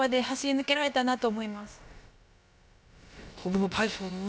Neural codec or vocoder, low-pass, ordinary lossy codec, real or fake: codec, 16 kHz, about 1 kbps, DyCAST, with the encoder's durations; none; none; fake